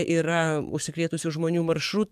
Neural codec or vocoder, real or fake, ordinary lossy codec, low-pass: codec, 44.1 kHz, 7.8 kbps, DAC; fake; MP3, 96 kbps; 14.4 kHz